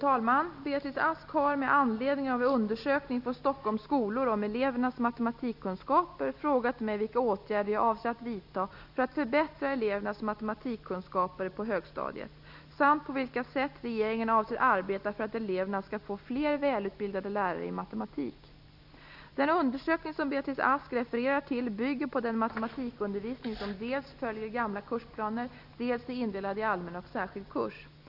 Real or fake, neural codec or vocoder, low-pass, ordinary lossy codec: real; none; 5.4 kHz; none